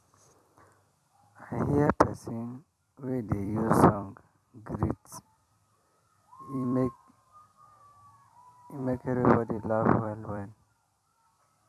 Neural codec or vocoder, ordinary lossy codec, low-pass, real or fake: vocoder, 44.1 kHz, 128 mel bands every 256 samples, BigVGAN v2; none; 14.4 kHz; fake